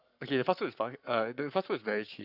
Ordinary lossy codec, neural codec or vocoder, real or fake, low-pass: none; codec, 44.1 kHz, 7.8 kbps, Pupu-Codec; fake; 5.4 kHz